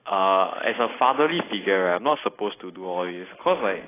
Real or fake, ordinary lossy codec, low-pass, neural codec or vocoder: real; AAC, 16 kbps; 3.6 kHz; none